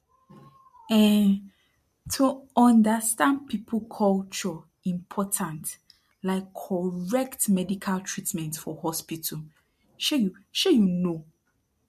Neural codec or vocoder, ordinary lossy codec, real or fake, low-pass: none; MP3, 64 kbps; real; 14.4 kHz